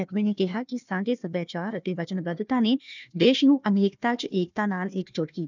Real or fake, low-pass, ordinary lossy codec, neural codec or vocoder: fake; 7.2 kHz; none; codec, 16 kHz, 1 kbps, FunCodec, trained on Chinese and English, 50 frames a second